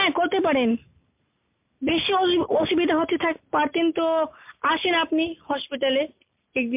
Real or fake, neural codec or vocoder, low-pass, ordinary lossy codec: real; none; 3.6 kHz; MP3, 32 kbps